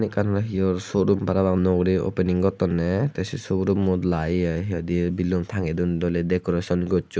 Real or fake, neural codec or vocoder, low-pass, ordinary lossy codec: real; none; none; none